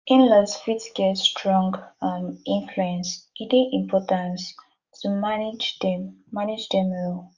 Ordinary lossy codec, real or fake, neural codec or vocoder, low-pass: Opus, 64 kbps; fake; codec, 44.1 kHz, 7.8 kbps, DAC; 7.2 kHz